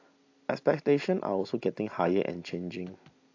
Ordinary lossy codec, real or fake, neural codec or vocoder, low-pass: AAC, 48 kbps; real; none; 7.2 kHz